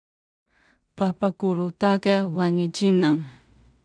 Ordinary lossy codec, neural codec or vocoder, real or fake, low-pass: MP3, 96 kbps; codec, 16 kHz in and 24 kHz out, 0.4 kbps, LongCat-Audio-Codec, two codebook decoder; fake; 9.9 kHz